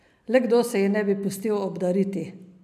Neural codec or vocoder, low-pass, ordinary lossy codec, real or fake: none; 14.4 kHz; none; real